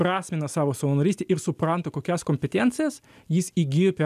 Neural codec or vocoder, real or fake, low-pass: none; real; 14.4 kHz